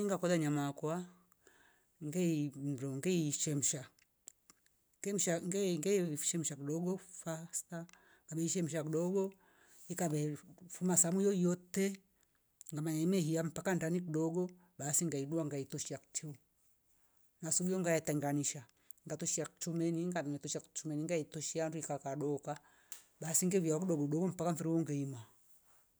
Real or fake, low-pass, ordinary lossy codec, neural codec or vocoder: real; none; none; none